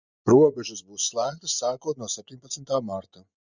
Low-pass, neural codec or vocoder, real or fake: 7.2 kHz; vocoder, 24 kHz, 100 mel bands, Vocos; fake